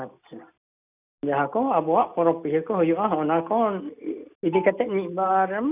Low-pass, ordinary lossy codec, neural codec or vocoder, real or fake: 3.6 kHz; none; none; real